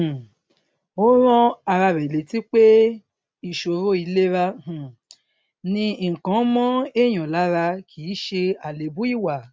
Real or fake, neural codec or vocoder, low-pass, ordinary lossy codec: real; none; none; none